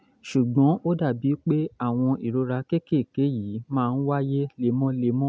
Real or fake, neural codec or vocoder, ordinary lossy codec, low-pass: real; none; none; none